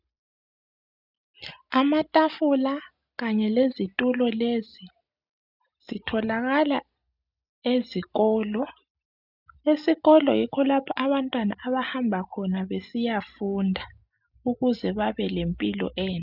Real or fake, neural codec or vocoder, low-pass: real; none; 5.4 kHz